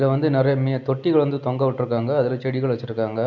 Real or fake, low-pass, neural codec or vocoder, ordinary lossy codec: real; 7.2 kHz; none; none